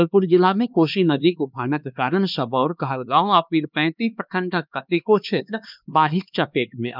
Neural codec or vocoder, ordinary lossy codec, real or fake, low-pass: codec, 16 kHz, 2 kbps, X-Codec, HuBERT features, trained on LibriSpeech; none; fake; 5.4 kHz